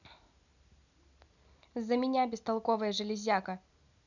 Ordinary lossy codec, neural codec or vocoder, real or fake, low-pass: none; none; real; 7.2 kHz